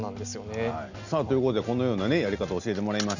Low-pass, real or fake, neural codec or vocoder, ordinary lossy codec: 7.2 kHz; real; none; none